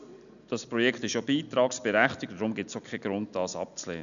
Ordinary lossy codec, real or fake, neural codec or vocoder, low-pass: none; real; none; 7.2 kHz